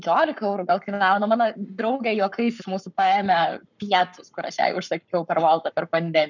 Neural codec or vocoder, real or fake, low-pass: codec, 44.1 kHz, 7.8 kbps, Pupu-Codec; fake; 7.2 kHz